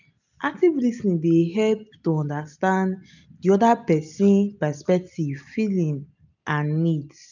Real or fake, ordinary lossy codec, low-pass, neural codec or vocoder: real; none; 7.2 kHz; none